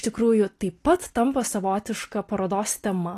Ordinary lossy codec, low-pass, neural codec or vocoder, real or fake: AAC, 48 kbps; 14.4 kHz; none; real